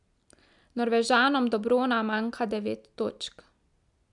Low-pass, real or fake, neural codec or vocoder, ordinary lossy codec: 10.8 kHz; real; none; MP3, 96 kbps